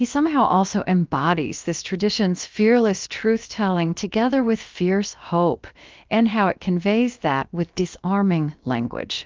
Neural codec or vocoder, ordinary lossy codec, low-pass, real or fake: codec, 16 kHz, about 1 kbps, DyCAST, with the encoder's durations; Opus, 32 kbps; 7.2 kHz; fake